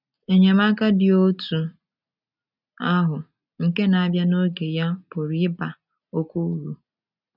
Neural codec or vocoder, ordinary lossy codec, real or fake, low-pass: none; none; real; 5.4 kHz